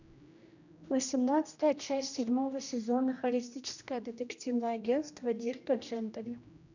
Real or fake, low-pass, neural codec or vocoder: fake; 7.2 kHz; codec, 16 kHz, 1 kbps, X-Codec, HuBERT features, trained on general audio